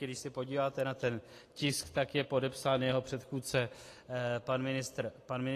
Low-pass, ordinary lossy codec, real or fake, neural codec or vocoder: 14.4 kHz; AAC, 48 kbps; real; none